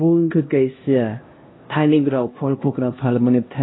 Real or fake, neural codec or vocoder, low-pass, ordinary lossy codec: fake; codec, 16 kHz, 1 kbps, X-Codec, HuBERT features, trained on LibriSpeech; 7.2 kHz; AAC, 16 kbps